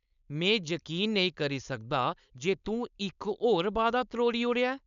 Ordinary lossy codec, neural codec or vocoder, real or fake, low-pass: none; codec, 16 kHz, 4.8 kbps, FACodec; fake; 7.2 kHz